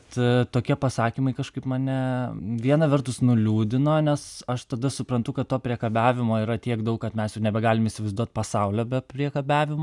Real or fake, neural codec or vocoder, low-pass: real; none; 10.8 kHz